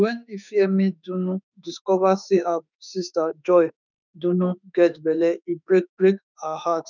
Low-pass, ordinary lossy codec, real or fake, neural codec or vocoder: 7.2 kHz; none; fake; autoencoder, 48 kHz, 32 numbers a frame, DAC-VAE, trained on Japanese speech